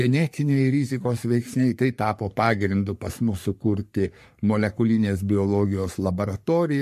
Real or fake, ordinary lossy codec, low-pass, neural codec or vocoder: fake; MP3, 64 kbps; 14.4 kHz; codec, 44.1 kHz, 3.4 kbps, Pupu-Codec